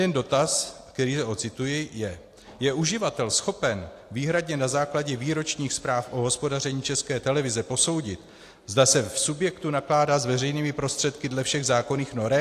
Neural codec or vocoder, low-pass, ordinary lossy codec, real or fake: none; 14.4 kHz; AAC, 64 kbps; real